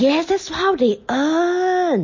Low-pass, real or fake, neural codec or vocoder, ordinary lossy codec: 7.2 kHz; real; none; MP3, 32 kbps